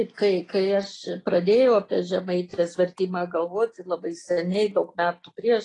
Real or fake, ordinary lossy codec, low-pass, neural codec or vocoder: real; AAC, 32 kbps; 10.8 kHz; none